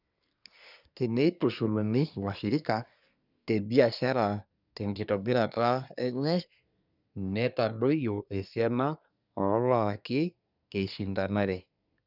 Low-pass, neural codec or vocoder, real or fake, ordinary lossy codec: 5.4 kHz; codec, 24 kHz, 1 kbps, SNAC; fake; none